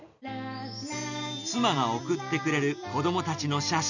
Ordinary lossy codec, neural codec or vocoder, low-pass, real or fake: none; none; 7.2 kHz; real